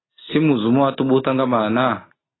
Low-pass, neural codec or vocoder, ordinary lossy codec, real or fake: 7.2 kHz; none; AAC, 16 kbps; real